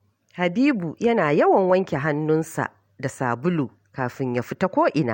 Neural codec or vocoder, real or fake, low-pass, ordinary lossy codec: none; real; 19.8 kHz; MP3, 64 kbps